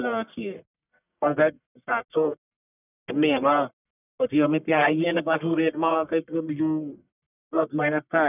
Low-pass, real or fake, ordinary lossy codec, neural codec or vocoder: 3.6 kHz; fake; none; codec, 44.1 kHz, 1.7 kbps, Pupu-Codec